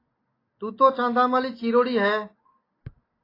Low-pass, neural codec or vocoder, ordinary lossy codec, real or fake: 5.4 kHz; none; AAC, 24 kbps; real